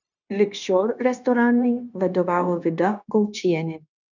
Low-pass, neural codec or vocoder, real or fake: 7.2 kHz; codec, 16 kHz, 0.9 kbps, LongCat-Audio-Codec; fake